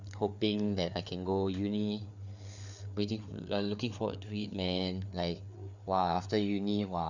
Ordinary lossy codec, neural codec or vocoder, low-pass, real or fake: none; codec, 16 kHz, 4 kbps, FreqCodec, larger model; 7.2 kHz; fake